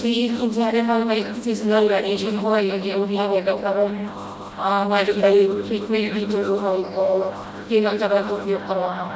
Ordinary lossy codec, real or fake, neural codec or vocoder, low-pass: none; fake; codec, 16 kHz, 0.5 kbps, FreqCodec, smaller model; none